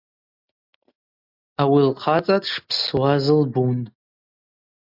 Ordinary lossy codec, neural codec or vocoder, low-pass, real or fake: MP3, 48 kbps; none; 5.4 kHz; real